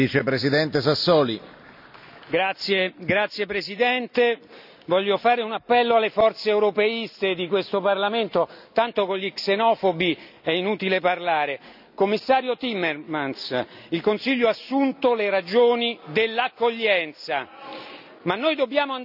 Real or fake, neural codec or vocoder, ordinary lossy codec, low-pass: real; none; none; 5.4 kHz